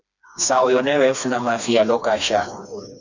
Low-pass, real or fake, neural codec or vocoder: 7.2 kHz; fake; codec, 16 kHz, 2 kbps, FreqCodec, smaller model